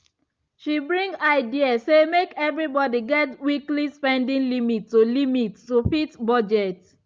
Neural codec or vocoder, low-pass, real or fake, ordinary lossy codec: none; 7.2 kHz; real; Opus, 24 kbps